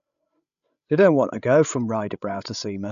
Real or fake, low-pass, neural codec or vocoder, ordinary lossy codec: fake; 7.2 kHz; codec, 16 kHz, 16 kbps, FreqCodec, larger model; none